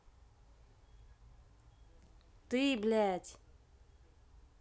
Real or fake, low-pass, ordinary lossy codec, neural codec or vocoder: real; none; none; none